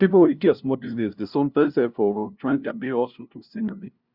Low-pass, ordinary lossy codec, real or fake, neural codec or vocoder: 5.4 kHz; Opus, 64 kbps; fake; codec, 16 kHz, 0.5 kbps, FunCodec, trained on LibriTTS, 25 frames a second